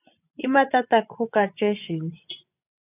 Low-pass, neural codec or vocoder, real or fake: 3.6 kHz; vocoder, 24 kHz, 100 mel bands, Vocos; fake